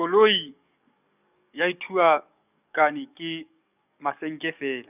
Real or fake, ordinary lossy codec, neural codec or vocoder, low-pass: fake; none; codec, 44.1 kHz, 7.8 kbps, DAC; 3.6 kHz